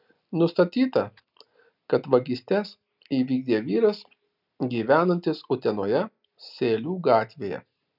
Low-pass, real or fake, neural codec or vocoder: 5.4 kHz; real; none